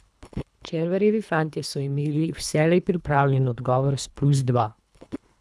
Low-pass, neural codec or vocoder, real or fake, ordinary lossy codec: none; codec, 24 kHz, 3 kbps, HILCodec; fake; none